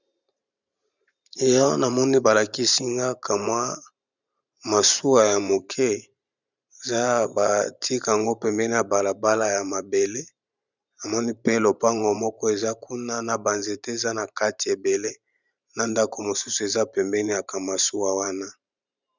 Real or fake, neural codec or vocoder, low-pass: fake; vocoder, 44.1 kHz, 128 mel bands every 512 samples, BigVGAN v2; 7.2 kHz